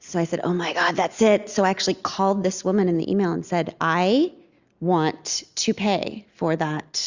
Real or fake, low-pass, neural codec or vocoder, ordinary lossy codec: real; 7.2 kHz; none; Opus, 64 kbps